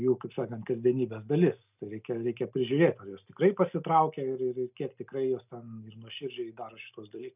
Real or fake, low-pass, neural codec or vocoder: real; 3.6 kHz; none